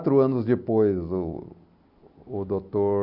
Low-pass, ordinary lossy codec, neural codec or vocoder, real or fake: 5.4 kHz; none; none; real